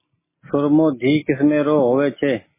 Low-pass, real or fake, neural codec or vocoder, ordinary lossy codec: 3.6 kHz; real; none; MP3, 16 kbps